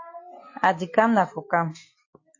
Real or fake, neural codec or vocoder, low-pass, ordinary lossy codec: real; none; 7.2 kHz; MP3, 32 kbps